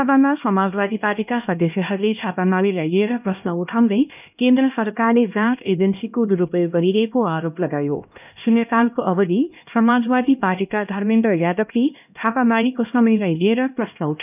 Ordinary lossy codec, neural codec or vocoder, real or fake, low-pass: none; codec, 16 kHz, 1 kbps, X-Codec, HuBERT features, trained on LibriSpeech; fake; 3.6 kHz